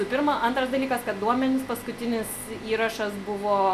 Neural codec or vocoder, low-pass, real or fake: none; 14.4 kHz; real